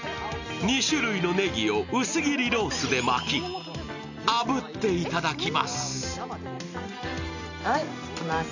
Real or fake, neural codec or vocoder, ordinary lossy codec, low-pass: real; none; none; 7.2 kHz